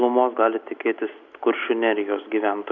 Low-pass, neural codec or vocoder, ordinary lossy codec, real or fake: 7.2 kHz; none; Opus, 64 kbps; real